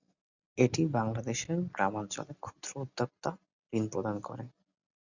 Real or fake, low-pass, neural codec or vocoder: real; 7.2 kHz; none